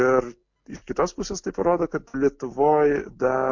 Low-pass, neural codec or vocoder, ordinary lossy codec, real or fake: 7.2 kHz; none; MP3, 48 kbps; real